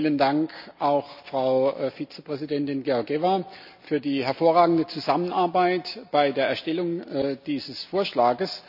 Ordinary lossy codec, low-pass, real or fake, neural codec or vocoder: none; 5.4 kHz; real; none